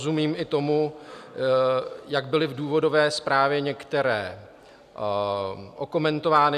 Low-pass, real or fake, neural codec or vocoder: 14.4 kHz; real; none